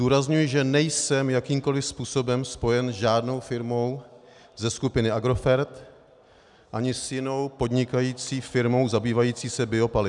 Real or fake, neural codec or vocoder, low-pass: real; none; 10.8 kHz